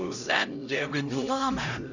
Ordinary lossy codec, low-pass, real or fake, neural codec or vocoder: none; 7.2 kHz; fake; codec, 16 kHz, 0.5 kbps, X-Codec, HuBERT features, trained on LibriSpeech